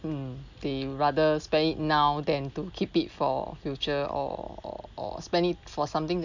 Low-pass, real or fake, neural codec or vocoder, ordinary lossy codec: 7.2 kHz; real; none; none